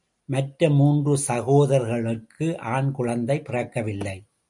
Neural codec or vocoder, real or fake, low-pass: none; real; 10.8 kHz